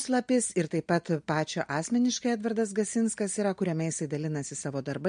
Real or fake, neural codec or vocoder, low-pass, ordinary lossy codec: real; none; 9.9 kHz; MP3, 48 kbps